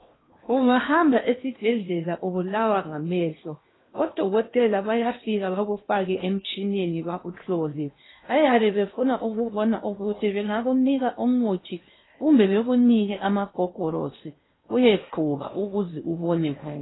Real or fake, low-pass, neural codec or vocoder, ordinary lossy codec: fake; 7.2 kHz; codec, 16 kHz in and 24 kHz out, 0.8 kbps, FocalCodec, streaming, 65536 codes; AAC, 16 kbps